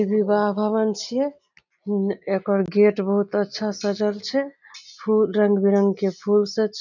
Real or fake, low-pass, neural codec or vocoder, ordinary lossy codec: real; 7.2 kHz; none; none